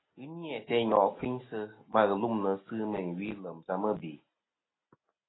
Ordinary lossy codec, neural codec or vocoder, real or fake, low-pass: AAC, 16 kbps; none; real; 7.2 kHz